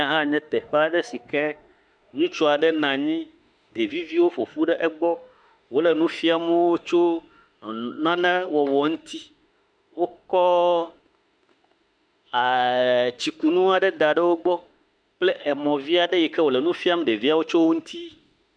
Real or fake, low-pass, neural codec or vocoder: fake; 9.9 kHz; autoencoder, 48 kHz, 32 numbers a frame, DAC-VAE, trained on Japanese speech